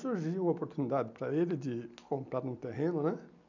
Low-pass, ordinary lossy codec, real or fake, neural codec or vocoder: 7.2 kHz; none; real; none